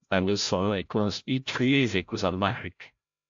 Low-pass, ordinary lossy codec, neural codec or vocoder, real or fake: 7.2 kHz; AAC, 64 kbps; codec, 16 kHz, 0.5 kbps, FreqCodec, larger model; fake